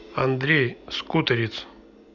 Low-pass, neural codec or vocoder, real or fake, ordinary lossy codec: 7.2 kHz; none; real; none